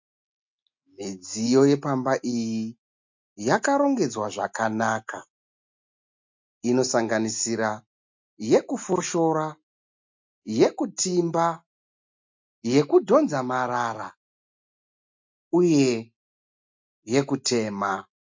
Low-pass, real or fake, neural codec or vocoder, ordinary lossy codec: 7.2 kHz; real; none; MP3, 48 kbps